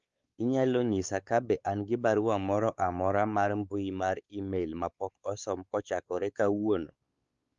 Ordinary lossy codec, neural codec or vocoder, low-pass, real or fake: Opus, 32 kbps; codec, 16 kHz, 4 kbps, X-Codec, WavLM features, trained on Multilingual LibriSpeech; 7.2 kHz; fake